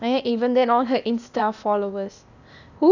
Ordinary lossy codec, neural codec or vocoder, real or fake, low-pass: none; codec, 16 kHz, 0.8 kbps, ZipCodec; fake; 7.2 kHz